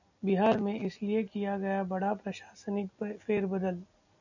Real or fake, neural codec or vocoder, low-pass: real; none; 7.2 kHz